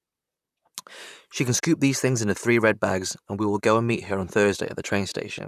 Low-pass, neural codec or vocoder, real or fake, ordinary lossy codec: 14.4 kHz; vocoder, 44.1 kHz, 128 mel bands, Pupu-Vocoder; fake; none